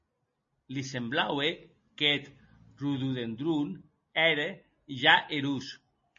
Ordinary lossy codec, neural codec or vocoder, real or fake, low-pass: MP3, 32 kbps; none; real; 7.2 kHz